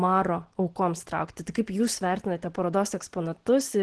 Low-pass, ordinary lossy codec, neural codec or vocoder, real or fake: 10.8 kHz; Opus, 16 kbps; none; real